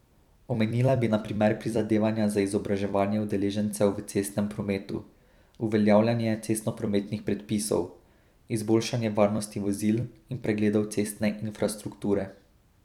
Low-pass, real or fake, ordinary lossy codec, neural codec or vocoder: 19.8 kHz; fake; none; vocoder, 44.1 kHz, 128 mel bands every 256 samples, BigVGAN v2